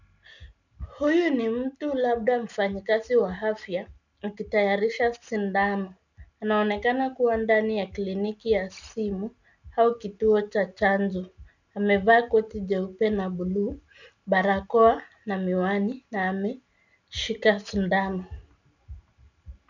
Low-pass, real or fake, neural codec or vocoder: 7.2 kHz; real; none